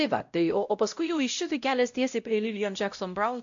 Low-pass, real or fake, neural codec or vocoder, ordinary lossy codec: 7.2 kHz; fake; codec, 16 kHz, 0.5 kbps, X-Codec, WavLM features, trained on Multilingual LibriSpeech; AAC, 64 kbps